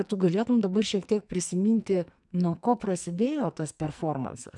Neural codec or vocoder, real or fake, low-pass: codec, 44.1 kHz, 2.6 kbps, SNAC; fake; 10.8 kHz